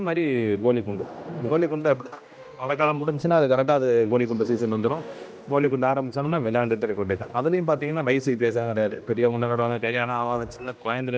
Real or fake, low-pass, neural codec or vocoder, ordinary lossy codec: fake; none; codec, 16 kHz, 1 kbps, X-Codec, HuBERT features, trained on general audio; none